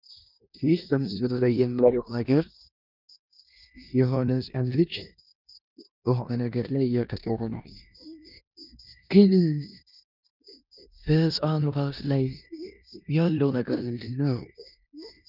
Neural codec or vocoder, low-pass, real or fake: codec, 16 kHz in and 24 kHz out, 0.9 kbps, LongCat-Audio-Codec, four codebook decoder; 5.4 kHz; fake